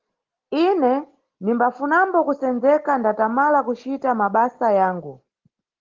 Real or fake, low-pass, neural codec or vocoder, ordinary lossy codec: real; 7.2 kHz; none; Opus, 16 kbps